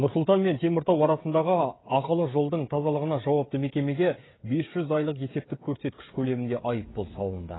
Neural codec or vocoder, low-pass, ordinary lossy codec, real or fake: codec, 16 kHz, 16 kbps, FreqCodec, smaller model; 7.2 kHz; AAC, 16 kbps; fake